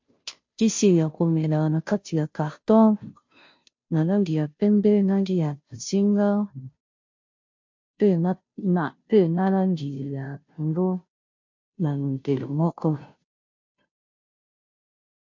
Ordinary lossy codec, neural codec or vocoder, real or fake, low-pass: MP3, 48 kbps; codec, 16 kHz, 0.5 kbps, FunCodec, trained on Chinese and English, 25 frames a second; fake; 7.2 kHz